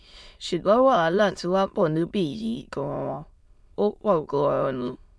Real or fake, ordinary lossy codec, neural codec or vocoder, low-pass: fake; none; autoencoder, 22.05 kHz, a latent of 192 numbers a frame, VITS, trained on many speakers; none